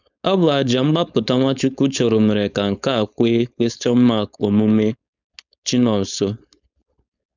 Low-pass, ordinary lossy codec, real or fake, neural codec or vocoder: 7.2 kHz; none; fake; codec, 16 kHz, 4.8 kbps, FACodec